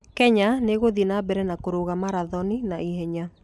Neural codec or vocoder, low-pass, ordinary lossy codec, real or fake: none; none; none; real